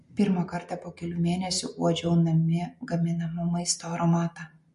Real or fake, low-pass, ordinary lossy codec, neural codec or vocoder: real; 14.4 kHz; MP3, 48 kbps; none